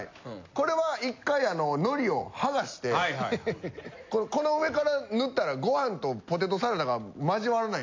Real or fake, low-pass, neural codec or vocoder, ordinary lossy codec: real; 7.2 kHz; none; MP3, 48 kbps